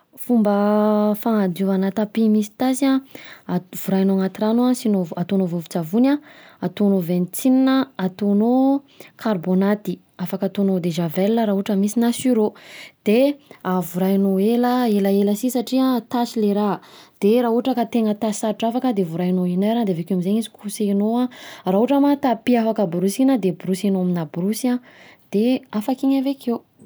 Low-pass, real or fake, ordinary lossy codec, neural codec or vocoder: none; real; none; none